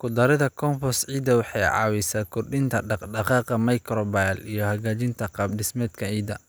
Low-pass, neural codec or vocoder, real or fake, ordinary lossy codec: none; none; real; none